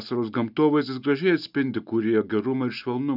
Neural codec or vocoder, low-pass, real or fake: none; 5.4 kHz; real